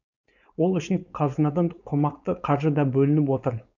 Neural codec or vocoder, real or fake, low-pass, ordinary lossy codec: codec, 16 kHz, 4.8 kbps, FACodec; fake; 7.2 kHz; none